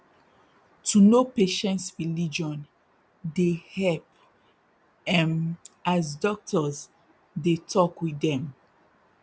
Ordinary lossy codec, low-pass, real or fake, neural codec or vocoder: none; none; real; none